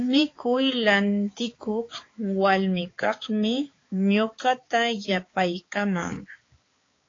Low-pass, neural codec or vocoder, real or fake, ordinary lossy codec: 7.2 kHz; codec, 16 kHz, 4 kbps, X-Codec, HuBERT features, trained on balanced general audio; fake; AAC, 32 kbps